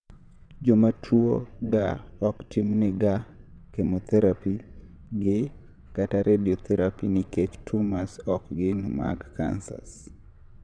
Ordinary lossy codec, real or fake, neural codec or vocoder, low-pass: none; fake; vocoder, 22.05 kHz, 80 mel bands, WaveNeXt; 9.9 kHz